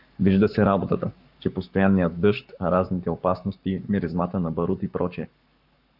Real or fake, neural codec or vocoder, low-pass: fake; codec, 44.1 kHz, 7.8 kbps, Pupu-Codec; 5.4 kHz